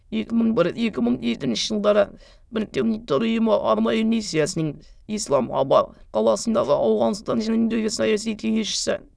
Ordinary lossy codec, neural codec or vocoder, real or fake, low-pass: none; autoencoder, 22.05 kHz, a latent of 192 numbers a frame, VITS, trained on many speakers; fake; none